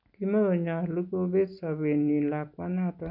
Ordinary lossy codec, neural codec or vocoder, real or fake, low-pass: none; none; real; 5.4 kHz